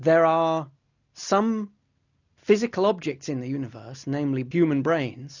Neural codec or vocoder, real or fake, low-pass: none; real; 7.2 kHz